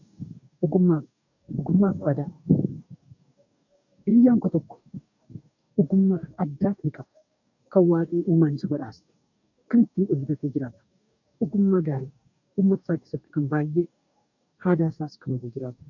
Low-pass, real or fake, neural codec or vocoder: 7.2 kHz; fake; codec, 44.1 kHz, 2.6 kbps, DAC